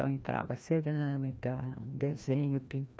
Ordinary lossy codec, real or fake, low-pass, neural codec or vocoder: none; fake; none; codec, 16 kHz, 1 kbps, FreqCodec, larger model